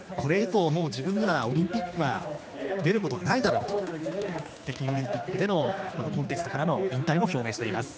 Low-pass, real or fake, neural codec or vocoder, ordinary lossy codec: none; fake; codec, 16 kHz, 2 kbps, X-Codec, HuBERT features, trained on general audio; none